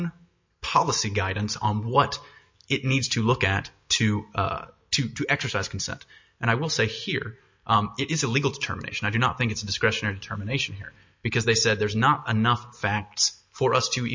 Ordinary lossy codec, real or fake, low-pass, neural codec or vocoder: MP3, 48 kbps; real; 7.2 kHz; none